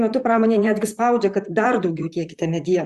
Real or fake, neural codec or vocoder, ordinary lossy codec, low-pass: fake; vocoder, 44.1 kHz, 128 mel bands, Pupu-Vocoder; Opus, 64 kbps; 14.4 kHz